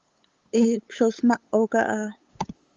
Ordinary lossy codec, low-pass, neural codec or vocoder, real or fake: Opus, 32 kbps; 7.2 kHz; codec, 16 kHz, 8 kbps, FunCodec, trained on LibriTTS, 25 frames a second; fake